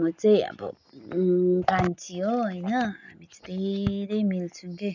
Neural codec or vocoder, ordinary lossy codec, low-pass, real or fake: none; none; 7.2 kHz; real